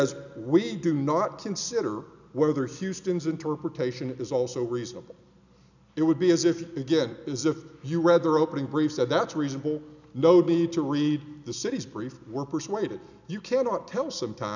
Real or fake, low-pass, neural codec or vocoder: real; 7.2 kHz; none